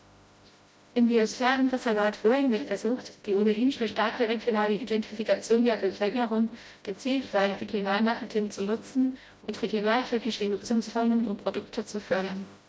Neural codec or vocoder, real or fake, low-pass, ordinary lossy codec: codec, 16 kHz, 0.5 kbps, FreqCodec, smaller model; fake; none; none